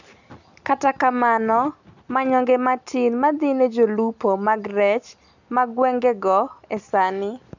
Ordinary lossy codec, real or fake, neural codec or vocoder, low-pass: none; real; none; 7.2 kHz